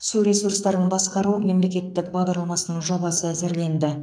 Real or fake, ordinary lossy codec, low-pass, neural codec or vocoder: fake; none; 9.9 kHz; codec, 44.1 kHz, 2.6 kbps, SNAC